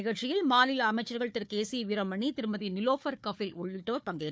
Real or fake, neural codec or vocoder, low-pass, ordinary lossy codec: fake; codec, 16 kHz, 4 kbps, FunCodec, trained on Chinese and English, 50 frames a second; none; none